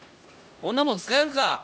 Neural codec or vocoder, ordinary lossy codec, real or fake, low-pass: codec, 16 kHz, 1 kbps, X-Codec, HuBERT features, trained on LibriSpeech; none; fake; none